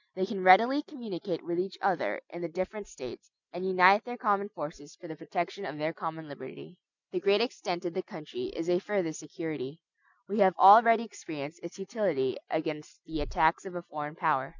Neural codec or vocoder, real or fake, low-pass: none; real; 7.2 kHz